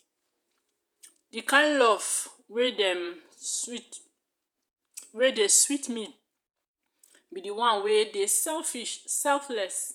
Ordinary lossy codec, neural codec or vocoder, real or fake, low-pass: none; vocoder, 48 kHz, 128 mel bands, Vocos; fake; none